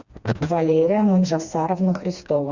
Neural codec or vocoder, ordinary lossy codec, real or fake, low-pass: codec, 16 kHz, 2 kbps, FreqCodec, smaller model; Opus, 64 kbps; fake; 7.2 kHz